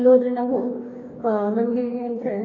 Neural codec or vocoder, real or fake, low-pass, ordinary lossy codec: codec, 44.1 kHz, 2.6 kbps, DAC; fake; 7.2 kHz; AAC, 32 kbps